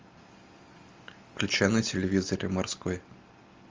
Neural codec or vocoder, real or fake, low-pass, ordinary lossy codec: none; real; 7.2 kHz; Opus, 32 kbps